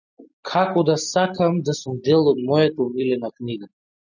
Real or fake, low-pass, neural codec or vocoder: real; 7.2 kHz; none